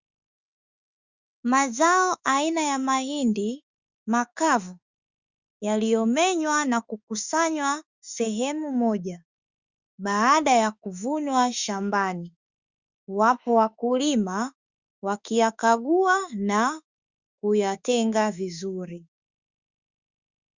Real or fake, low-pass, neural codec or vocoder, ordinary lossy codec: fake; 7.2 kHz; autoencoder, 48 kHz, 32 numbers a frame, DAC-VAE, trained on Japanese speech; Opus, 64 kbps